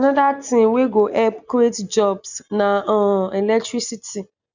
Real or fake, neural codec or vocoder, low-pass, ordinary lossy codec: real; none; 7.2 kHz; none